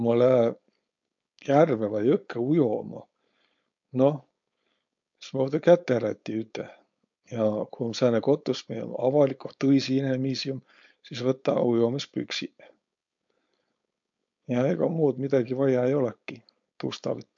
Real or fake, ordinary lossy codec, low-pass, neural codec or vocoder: fake; MP3, 48 kbps; 7.2 kHz; codec, 16 kHz, 4.8 kbps, FACodec